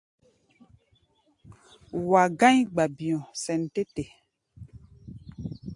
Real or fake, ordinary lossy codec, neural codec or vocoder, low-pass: real; AAC, 64 kbps; none; 10.8 kHz